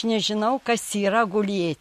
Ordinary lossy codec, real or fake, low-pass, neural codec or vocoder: MP3, 64 kbps; real; 14.4 kHz; none